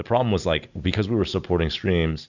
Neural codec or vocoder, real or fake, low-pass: none; real; 7.2 kHz